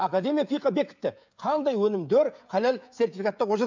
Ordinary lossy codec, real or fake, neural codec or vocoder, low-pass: MP3, 64 kbps; fake; codec, 16 kHz, 16 kbps, FreqCodec, smaller model; 7.2 kHz